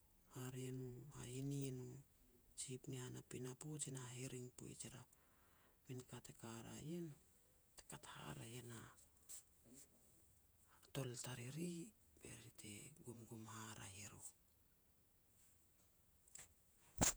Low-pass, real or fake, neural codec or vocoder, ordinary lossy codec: none; fake; vocoder, 48 kHz, 128 mel bands, Vocos; none